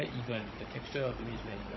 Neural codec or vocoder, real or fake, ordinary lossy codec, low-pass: codec, 16 kHz, 16 kbps, FunCodec, trained on Chinese and English, 50 frames a second; fake; MP3, 24 kbps; 7.2 kHz